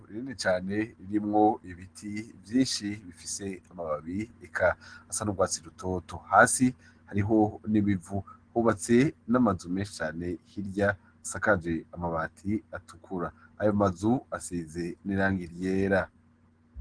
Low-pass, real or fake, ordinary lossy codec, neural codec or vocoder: 9.9 kHz; real; Opus, 16 kbps; none